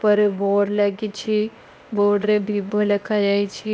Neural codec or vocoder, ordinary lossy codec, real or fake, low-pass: codec, 16 kHz, 0.8 kbps, ZipCodec; none; fake; none